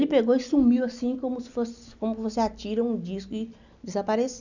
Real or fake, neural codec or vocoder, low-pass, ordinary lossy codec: real; none; 7.2 kHz; none